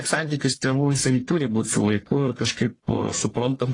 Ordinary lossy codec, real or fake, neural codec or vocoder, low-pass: AAC, 32 kbps; fake; codec, 44.1 kHz, 1.7 kbps, Pupu-Codec; 10.8 kHz